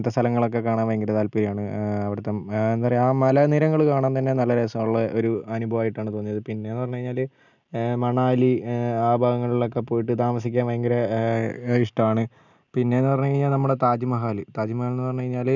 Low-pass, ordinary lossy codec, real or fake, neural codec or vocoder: 7.2 kHz; none; real; none